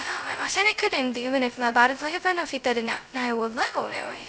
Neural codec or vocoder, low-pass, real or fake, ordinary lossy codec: codec, 16 kHz, 0.2 kbps, FocalCodec; none; fake; none